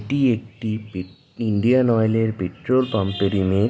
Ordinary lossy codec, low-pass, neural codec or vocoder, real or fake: none; none; none; real